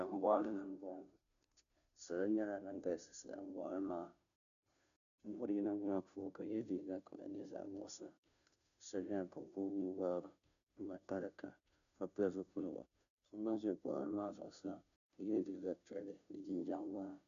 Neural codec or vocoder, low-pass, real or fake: codec, 16 kHz, 0.5 kbps, FunCodec, trained on Chinese and English, 25 frames a second; 7.2 kHz; fake